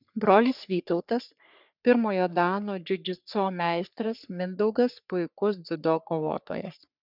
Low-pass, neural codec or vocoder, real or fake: 5.4 kHz; codec, 44.1 kHz, 3.4 kbps, Pupu-Codec; fake